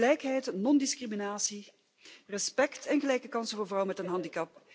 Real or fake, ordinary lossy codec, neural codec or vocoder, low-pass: real; none; none; none